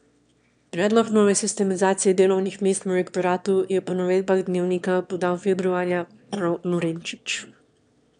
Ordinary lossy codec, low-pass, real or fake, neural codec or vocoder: none; 9.9 kHz; fake; autoencoder, 22.05 kHz, a latent of 192 numbers a frame, VITS, trained on one speaker